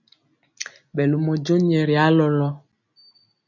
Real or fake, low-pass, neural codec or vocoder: real; 7.2 kHz; none